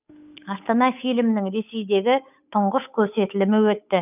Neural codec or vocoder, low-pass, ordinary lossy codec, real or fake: codec, 16 kHz, 8 kbps, FunCodec, trained on Chinese and English, 25 frames a second; 3.6 kHz; none; fake